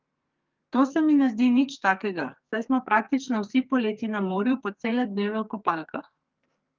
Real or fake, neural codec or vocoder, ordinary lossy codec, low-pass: fake; codec, 44.1 kHz, 2.6 kbps, SNAC; Opus, 24 kbps; 7.2 kHz